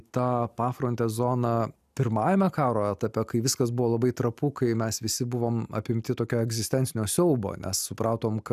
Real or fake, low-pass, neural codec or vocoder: real; 14.4 kHz; none